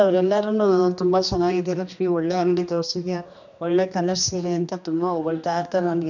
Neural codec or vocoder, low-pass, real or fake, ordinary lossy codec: codec, 16 kHz, 2 kbps, X-Codec, HuBERT features, trained on general audio; 7.2 kHz; fake; none